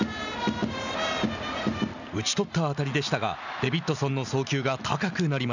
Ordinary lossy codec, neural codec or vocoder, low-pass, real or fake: none; none; 7.2 kHz; real